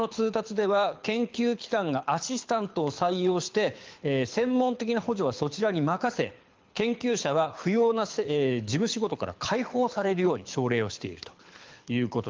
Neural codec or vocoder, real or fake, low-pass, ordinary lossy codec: codec, 24 kHz, 6 kbps, HILCodec; fake; 7.2 kHz; Opus, 24 kbps